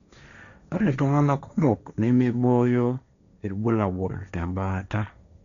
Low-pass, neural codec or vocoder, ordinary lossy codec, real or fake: 7.2 kHz; codec, 16 kHz, 1.1 kbps, Voila-Tokenizer; none; fake